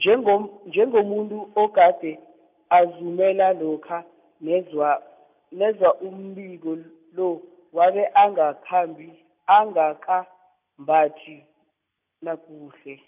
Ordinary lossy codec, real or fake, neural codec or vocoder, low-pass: none; real; none; 3.6 kHz